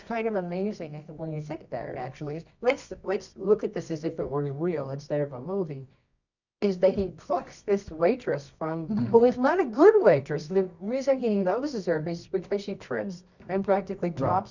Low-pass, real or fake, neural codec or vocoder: 7.2 kHz; fake; codec, 24 kHz, 0.9 kbps, WavTokenizer, medium music audio release